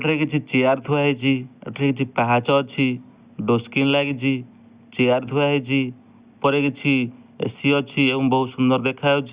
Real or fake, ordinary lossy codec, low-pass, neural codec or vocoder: real; Opus, 64 kbps; 3.6 kHz; none